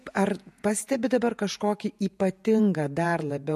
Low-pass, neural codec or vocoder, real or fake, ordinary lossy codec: 14.4 kHz; vocoder, 48 kHz, 128 mel bands, Vocos; fake; MP3, 64 kbps